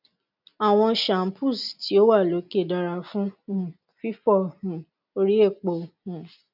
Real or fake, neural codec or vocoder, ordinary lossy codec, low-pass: real; none; none; 5.4 kHz